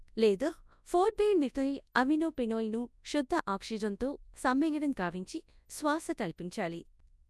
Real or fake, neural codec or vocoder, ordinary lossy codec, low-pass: fake; codec, 24 kHz, 0.9 kbps, WavTokenizer, large speech release; none; none